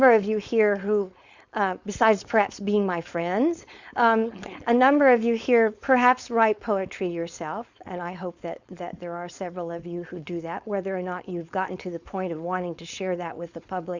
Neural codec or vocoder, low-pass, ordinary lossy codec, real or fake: codec, 16 kHz, 4.8 kbps, FACodec; 7.2 kHz; Opus, 64 kbps; fake